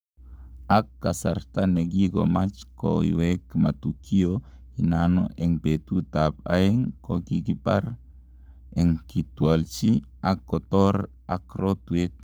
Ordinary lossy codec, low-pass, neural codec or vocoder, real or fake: none; none; codec, 44.1 kHz, 7.8 kbps, Pupu-Codec; fake